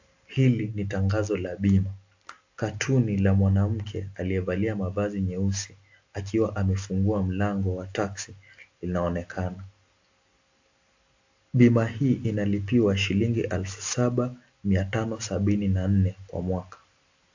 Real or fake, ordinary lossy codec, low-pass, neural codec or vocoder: real; AAC, 48 kbps; 7.2 kHz; none